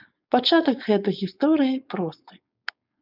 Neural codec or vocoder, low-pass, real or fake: codec, 16 kHz, 4.8 kbps, FACodec; 5.4 kHz; fake